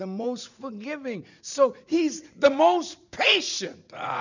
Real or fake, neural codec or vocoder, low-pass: fake; vocoder, 44.1 kHz, 80 mel bands, Vocos; 7.2 kHz